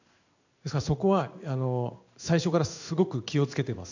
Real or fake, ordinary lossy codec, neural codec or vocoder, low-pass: fake; none; codec, 16 kHz in and 24 kHz out, 1 kbps, XY-Tokenizer; 7.2 kHz